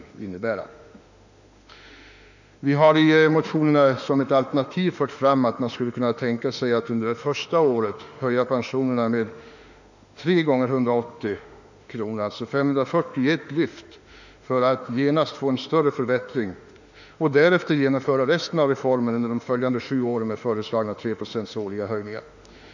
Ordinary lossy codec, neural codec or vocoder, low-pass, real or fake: none; autoencoder, 48 kHz, 32 numbers a frame, DAC-VAE, trained on Japanese speech; 7.2 kHz; fake